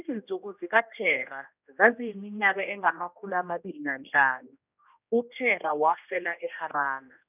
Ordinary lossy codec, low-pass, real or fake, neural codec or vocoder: none; 3.6 kHz; fake; codec, 16 kHz, 1 kbps, X-Codec, HuBERT features, trained on general audio